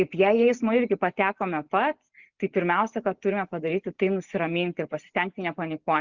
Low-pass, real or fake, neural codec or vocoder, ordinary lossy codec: 7.2 kHz; real; none; Opus, 64 kbps